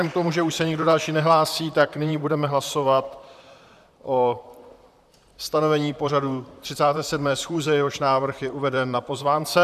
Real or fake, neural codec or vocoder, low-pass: fake; vocoder, 44.1 kHz, 128 mel bands, Pupu-Vocoder; 14.4 kHz